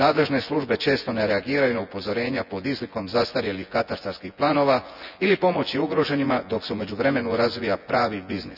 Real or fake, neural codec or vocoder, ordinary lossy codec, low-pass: fake; vocoder, 24 kHz, 100 mel bands, Vocos; none; 5.4 kHz